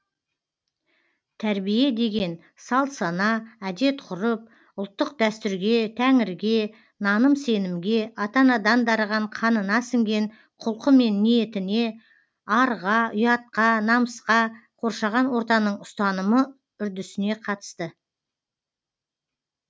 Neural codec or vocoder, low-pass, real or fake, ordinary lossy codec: none; none; real; none